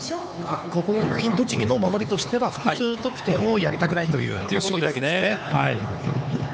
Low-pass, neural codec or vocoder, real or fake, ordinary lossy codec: none; codec, 16 kHz, 4 kbps, X-Codec, HuBERT features, trained on LibriSpeech; fake; none